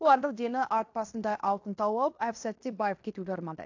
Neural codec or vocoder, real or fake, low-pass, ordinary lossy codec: codec, 16 kHz in and 24 kHz out, 0.9 kbps, LongCat-Audio-Codec, fine tuned four codebook decoder; fake; 7.2 kHz; AAC, 48 kbps